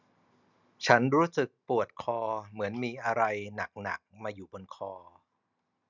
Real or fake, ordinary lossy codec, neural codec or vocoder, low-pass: real; none; none; 7.2 kHz